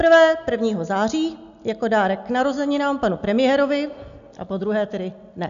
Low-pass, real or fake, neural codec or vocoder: 7.2 kHz; real; none